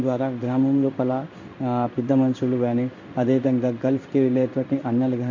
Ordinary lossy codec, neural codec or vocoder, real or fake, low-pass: AAC, 48 kbps; codec, 16 kHz in and 24 kHz out, 1 kbps, XY-Tokenizer; fake; 7.2 kHz